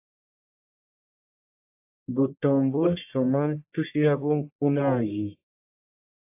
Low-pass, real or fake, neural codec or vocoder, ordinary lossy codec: 3.6 kHz; fake; codec, 44.1 kHz, 1.7 kbps, Pupu-Codec; AAC, 32 kbps